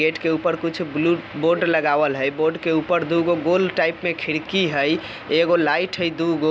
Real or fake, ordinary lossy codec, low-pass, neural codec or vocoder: real; none; none; none